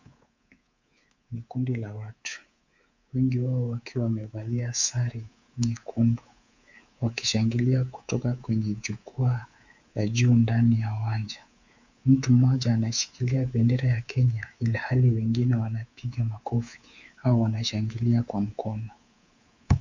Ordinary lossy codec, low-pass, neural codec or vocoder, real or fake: Opus, 64 kbps; 7.2 kHz; codec, 24 kHz, 3.1 kbps, DualCodec; fake